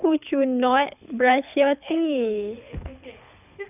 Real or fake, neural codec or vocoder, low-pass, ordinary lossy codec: fake; codec, 24 kHz, 6 kbps, HILCodec; 3.6 kHz; none